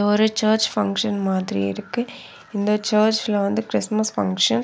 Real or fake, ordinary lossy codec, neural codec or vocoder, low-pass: real; none; none; none